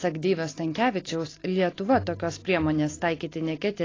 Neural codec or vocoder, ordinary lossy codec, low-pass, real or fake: none; AAC, 32 kbps; 7.2 kHz; real